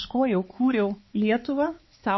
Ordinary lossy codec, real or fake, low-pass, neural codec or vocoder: MP3, 24 kbps; fake; 7.2 kHz; codec, 16 kHz, 4 kbps, X-Codec, HuBERT features, trained on balanced general audio